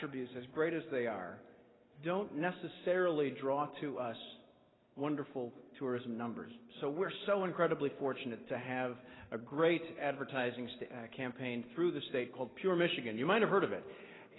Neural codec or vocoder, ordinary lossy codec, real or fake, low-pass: none; AAC, 16 kbps; real; 7.2 kHz